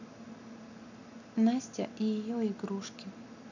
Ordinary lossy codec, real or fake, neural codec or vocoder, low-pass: none; real; none; 7.2 kHz